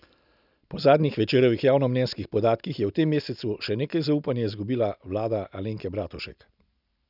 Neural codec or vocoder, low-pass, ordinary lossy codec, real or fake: none; 5.4 kHz; none; real